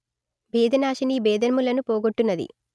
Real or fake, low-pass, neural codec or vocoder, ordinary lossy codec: real; none; none; none